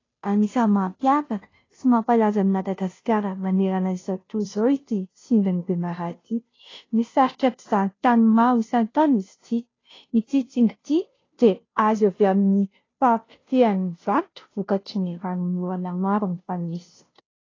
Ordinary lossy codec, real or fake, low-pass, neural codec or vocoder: AAC, 32 kbps; fake; 7.2 kHz; codec, 16 kHz, 0.5 kbps, FunCodec, trained on Chinese and English, 25 frames a second